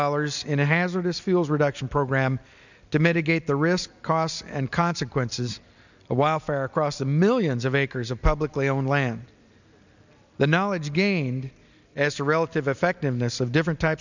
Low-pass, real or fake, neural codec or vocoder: 7.2 kHz; real; none